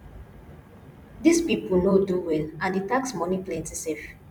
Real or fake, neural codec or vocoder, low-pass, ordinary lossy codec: fake; vocoder, 44.1 kHz, 128 mel bands every 256 samples, BigVGAN v2; 19.8 kHz; none